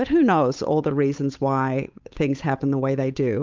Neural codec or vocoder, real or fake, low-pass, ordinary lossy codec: codec, 16 kHz, 8 kbps, FunCodec, trained on Chinese and English, 25 frames a second; fake; 7.2 kHz; Opus, 24 kbps